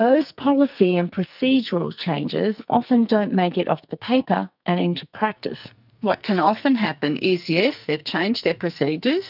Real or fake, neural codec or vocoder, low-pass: fake; codec, 44.1 kHz, 2.6 kbps, SNAC; 5.4 kHz